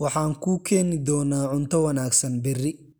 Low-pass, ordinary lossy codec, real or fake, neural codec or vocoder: none; none; real; none